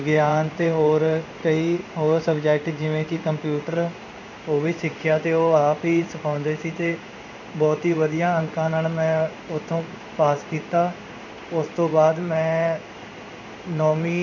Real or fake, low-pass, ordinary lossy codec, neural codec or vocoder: fake; 7.2 kHz; none; codec, 16 kHz in and 24 kHz out, 1 kbps, XY-Tokenizer